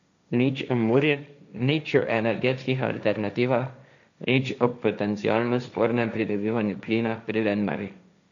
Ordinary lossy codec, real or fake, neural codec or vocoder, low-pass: none; fake; codec, 16 kHz, 1.1 kbps, Voila-Tokenizer; 7.2 kHz